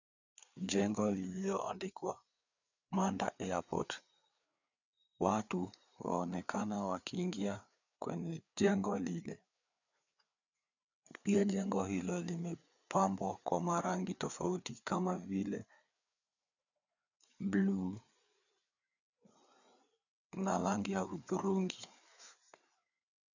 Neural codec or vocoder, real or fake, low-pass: codec, 16 kHz, 4 kbps, FreqCodec, larger model; fake; 7.2 kHz